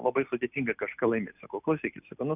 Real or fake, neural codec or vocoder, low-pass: real; none; 3.6 kHz